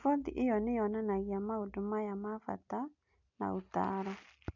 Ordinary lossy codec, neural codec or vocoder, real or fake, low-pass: none; none; real; 7.2 kHz